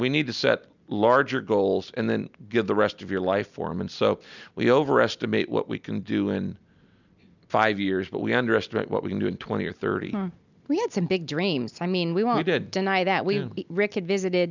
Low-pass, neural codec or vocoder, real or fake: 7.2 kHz; none; real